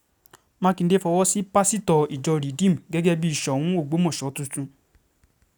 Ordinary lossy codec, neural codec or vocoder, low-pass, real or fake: none; none; none; real